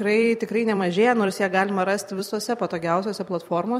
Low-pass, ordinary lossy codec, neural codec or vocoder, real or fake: 19.8 kHz; MP3, 64 kbps; vocoder, 44.1 kHz, 128 mel bands every 256 samples, BigVGAN v2; fake